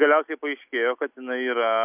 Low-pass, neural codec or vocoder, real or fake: 3.6 kHz; none; real